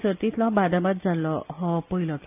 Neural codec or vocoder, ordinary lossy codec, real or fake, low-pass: none; AAC, 32 kbps; real; 3.6 kHz